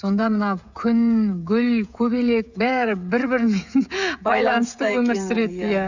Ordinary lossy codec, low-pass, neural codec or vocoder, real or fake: none; 7.2 kHz; codec, 16 kHz, 16 kbps, FreqCodec, smaller model; fake